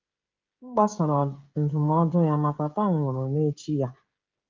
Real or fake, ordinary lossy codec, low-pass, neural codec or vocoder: fake; Opus, 24 kbps; 7.2 kHz; codec, 16 kHz, 16 kbps, FreqCodec, smaller model